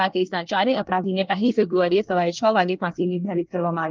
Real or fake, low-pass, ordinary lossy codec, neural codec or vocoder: fake; 7.2 kHz; Opus, 24 kbps; codec, 24 kHz, 1 kbps, SNAC